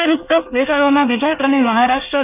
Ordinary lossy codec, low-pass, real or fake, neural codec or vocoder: none; 3.6 kHz; fake; codec, 24 kHz, 1 kbps, SNAC